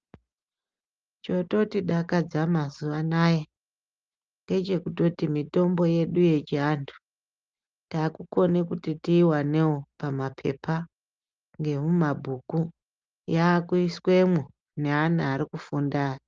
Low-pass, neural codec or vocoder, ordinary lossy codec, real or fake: 7.2 kHz; none; Opus, 24 kbps; real